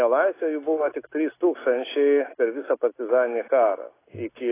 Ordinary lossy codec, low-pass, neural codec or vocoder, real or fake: AAC, 16 kbps; 3.6 kHz; none; real